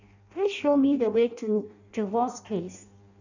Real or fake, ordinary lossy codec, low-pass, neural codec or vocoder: fake; none; 7.2 kHz; codec, 16 kHz in and 24 kHz out, 0.6 kbps, FireRedTTS-2 codec